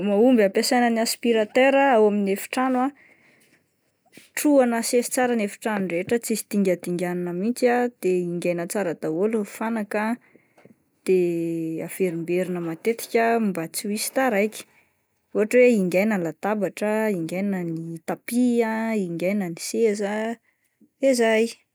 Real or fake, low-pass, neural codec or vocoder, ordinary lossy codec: real; none; none; none